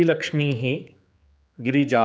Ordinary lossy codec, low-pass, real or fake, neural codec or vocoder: none; none; fake; codec, 16 kHz, 4 kbps, X-Codec, HuBERT features, trained on general audio